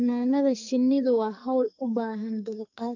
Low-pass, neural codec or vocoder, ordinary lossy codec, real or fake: 7.2 kHz; codec, 44.1 kHz, 2.6 kbps, SNAC; AAC, 48 kbps; fake